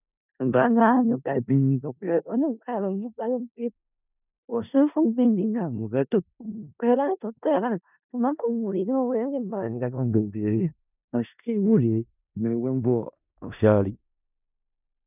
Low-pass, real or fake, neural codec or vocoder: 3.6 kHz; fake; codec, 16 kHz in and 24 kHz out, 0.4 kbps, LongCat-Audio-Codec, four codebook decoder